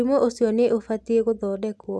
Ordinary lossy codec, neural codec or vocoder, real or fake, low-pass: none; none; real; none